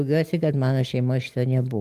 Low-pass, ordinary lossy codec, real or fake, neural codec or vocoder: 14.4 kHz; Opus, 24 kbps; real; none